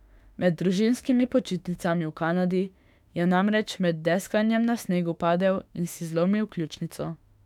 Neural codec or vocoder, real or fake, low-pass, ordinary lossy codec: autoencoder, 48 kHz, 32 numbers a frame, DAC-VAE, trained on Japanese speech; fake; 19.8 kHz; none